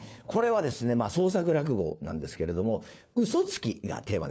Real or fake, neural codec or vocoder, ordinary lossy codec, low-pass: fake; codec, 16 kHz, 4 kbps, FunCodec, trained on LibriTTS, 50 frames a second; none; none